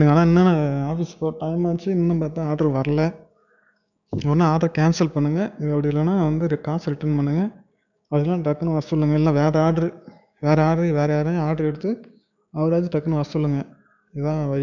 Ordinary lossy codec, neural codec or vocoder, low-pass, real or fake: none; codec, 16 kHz, 6 kbps, DAC; 7.2 kHz; fake